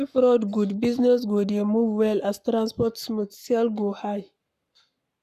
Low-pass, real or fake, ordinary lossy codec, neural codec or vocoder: 14.4 kHz; fake; none; codec, 44.1 kHz, 7.8 kbps, Pupu-Codec